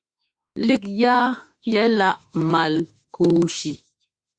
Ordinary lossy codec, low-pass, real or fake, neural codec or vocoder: Opus, 64 kbps; 9.9 kHz; fake; autoencoder, 48 kHz, 32 numbers a frame, DAC-VAE, trained on Japanese speech